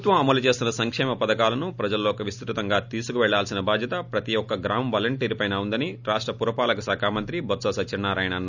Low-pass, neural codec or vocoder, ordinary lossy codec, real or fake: 7.2 kHz; none; none; real